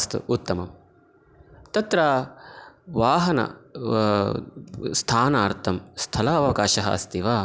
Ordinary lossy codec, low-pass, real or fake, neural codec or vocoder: none; none; real; none